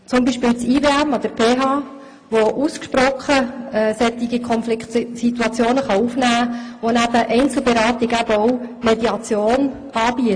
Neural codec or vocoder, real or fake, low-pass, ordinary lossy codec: none; real; 9.9 kHz; AAC, 48 kbps